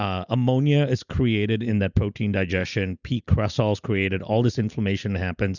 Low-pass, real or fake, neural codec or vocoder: 7.2 kHz; real; none